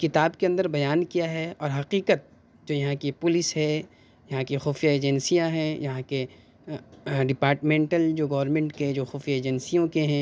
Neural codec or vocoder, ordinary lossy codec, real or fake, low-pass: none; none; real; none